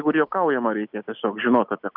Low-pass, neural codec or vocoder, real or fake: 5.4 kHz; none; real